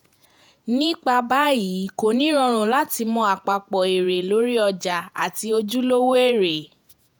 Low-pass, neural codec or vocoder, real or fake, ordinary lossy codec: none; vocoder, 48 kHz, 128 mel bands, Vocos; fake; none